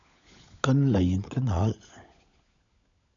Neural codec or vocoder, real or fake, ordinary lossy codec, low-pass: codec, 16 kHz, 16 kbps, FunCodec, trained on LibriTTS, 50 frames a second; fake; MP3, 96 kbps; 7.2 kHz